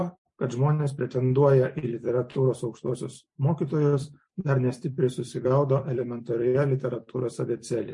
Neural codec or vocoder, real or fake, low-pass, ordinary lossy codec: vocoder, 44.1 kHz, 128 mel bands every 256 samples, BigVGAN v2; fake; 10.8 kHz; MP3, 48 kbps